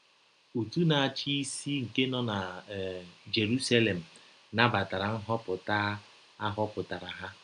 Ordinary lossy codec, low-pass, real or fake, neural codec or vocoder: AAC, 96 kbps; 9.9 kHz; real; none